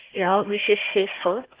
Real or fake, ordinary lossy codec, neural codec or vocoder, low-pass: fake; Opus, 16 kbps; codec, 16 kHz, 1 kbps, FunCodec, trained on Chinese and English, 50 frames a second; 3.6 kHz